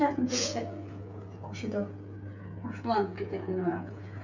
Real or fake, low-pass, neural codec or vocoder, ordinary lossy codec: fake; 7.2 kHz; codec, 16 kHz, 8 kbps, FreqCodec, smaller model; none